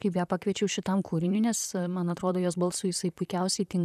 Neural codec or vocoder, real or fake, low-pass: vocoder, 44.1 kHz, 128 mel bands, Pupu-Vocoder; fake; 14.4 kHz